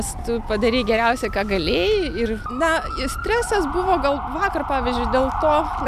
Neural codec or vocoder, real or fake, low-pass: none; real; 14.4 kHz